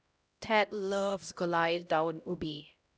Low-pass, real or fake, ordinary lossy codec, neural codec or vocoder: none; fake; none; codec, 16 kHz, 0.5 kbps, X-Codec, HuBERT features, trained on LibriSpeech